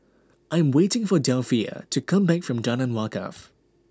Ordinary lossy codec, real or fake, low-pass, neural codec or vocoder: none; real; none; none